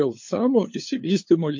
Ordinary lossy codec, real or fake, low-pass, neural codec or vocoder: MP3, 48 kbps; fake; 7.2 kHz; codec, 16 kHz, 2 kbps, FunCodec, trained on LibriTTS, 25 frames a second